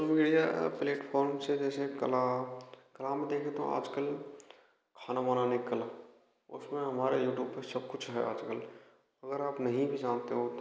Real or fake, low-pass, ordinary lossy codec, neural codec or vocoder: real; none; none; none